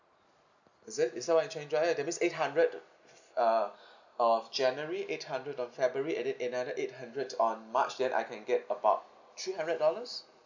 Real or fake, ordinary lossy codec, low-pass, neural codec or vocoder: real; none; 7.2 kHz; none